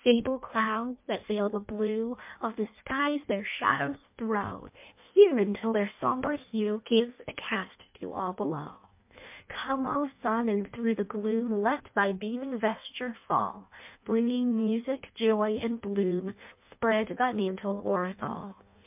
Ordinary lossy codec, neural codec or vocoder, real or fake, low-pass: MP3, 32 kbps; codec, 16 kHz in and 24 kHz out, 0.6 kbps, FireRedTTS-2 codec; fake; 3.6 kHz